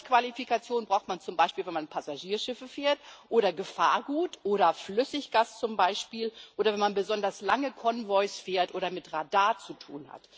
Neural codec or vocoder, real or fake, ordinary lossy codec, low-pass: none; real; none; none